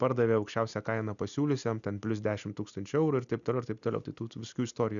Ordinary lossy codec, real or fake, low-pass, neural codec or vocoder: MP3, 96 kbps; real; 7.2 kHz; none